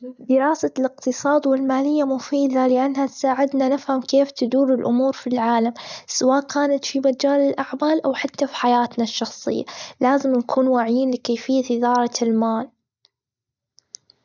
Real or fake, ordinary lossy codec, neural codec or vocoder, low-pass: real; none; none; 7.2 kHz